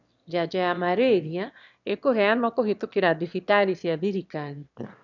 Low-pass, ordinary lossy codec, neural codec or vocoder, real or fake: 7.2 kHz; none; autoencoder, 22.05 kHz, a latent of 192 numbers a frame, VITS, trained on one speaker; fake